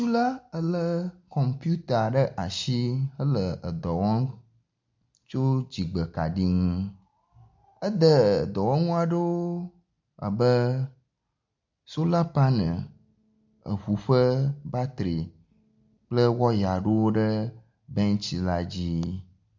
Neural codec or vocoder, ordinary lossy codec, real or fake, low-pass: none; MP3, 64 kbps; real; 7.2 kHz